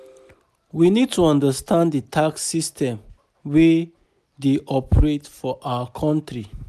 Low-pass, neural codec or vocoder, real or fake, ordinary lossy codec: 14.4 kHz; none; real; none